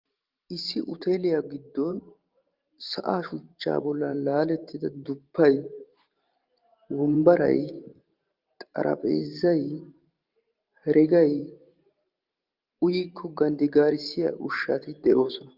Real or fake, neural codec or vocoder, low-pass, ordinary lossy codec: fake; vocoder, 44.1 kHz, 128 mel bands every 512 samples, BigVGAN v2; 5.4 kHz; Opus, 24 kbps